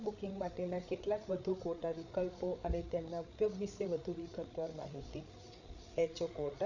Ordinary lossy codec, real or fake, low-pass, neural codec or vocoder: none; fake; 7.2 kHz; codec, 16 kHz, 8 kbps, FreqCodec, larger model